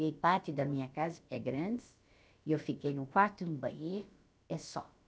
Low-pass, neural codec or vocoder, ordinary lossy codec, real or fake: none; codec, 16 kHz, about 1 kbps, DyCAST, with the encoder's durations; none; fake